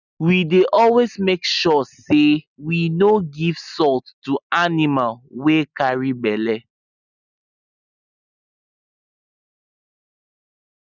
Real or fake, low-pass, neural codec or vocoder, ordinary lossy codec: real; 7.2 kHz; none; none